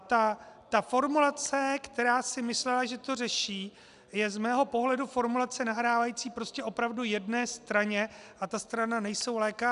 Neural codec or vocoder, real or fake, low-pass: none; real; 10.8 kHz